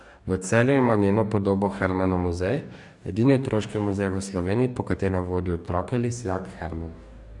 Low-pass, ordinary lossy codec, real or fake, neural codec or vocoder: 10.8 kHz; none; fake; codec, 44.1 kHz, 2.6 kbps, DAC